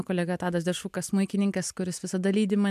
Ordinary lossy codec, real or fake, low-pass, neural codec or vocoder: MP3, 96 kbps; real; 14.4 kHz; none